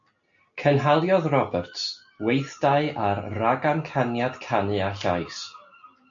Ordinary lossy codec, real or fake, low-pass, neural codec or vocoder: AAC, 64 kbps; real; 7.2 kHz; none